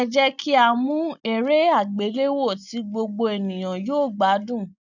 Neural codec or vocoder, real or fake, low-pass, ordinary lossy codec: none; real; 7.2 kHz; none